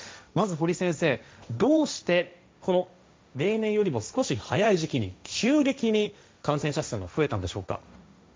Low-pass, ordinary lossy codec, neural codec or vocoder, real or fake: none; none; codec, 16 kHz, 1.1 kbps, Voila-Tokenizer; fake